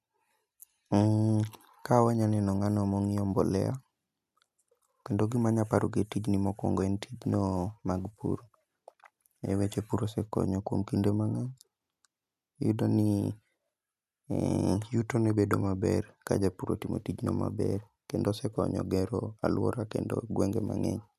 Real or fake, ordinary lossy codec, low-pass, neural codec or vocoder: real; none; 14.4 kHz; none